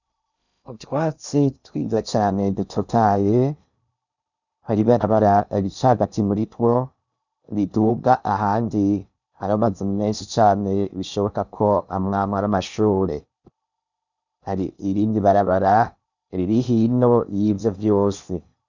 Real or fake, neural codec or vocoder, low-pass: fake; codec, 16 kHz in and 24 kHz out, 0.6 kbps, FocalCodec, streaming, 2048 codes; 7.2 kHz